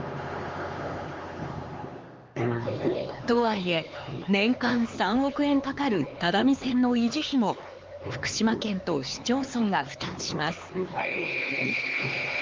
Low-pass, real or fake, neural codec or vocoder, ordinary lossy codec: 7.2 kHz; fake; codec, 16 kHz, 4 kbps, X-Codec, HuBERT features, trained on LibriSpeech; Opus, 32 kbps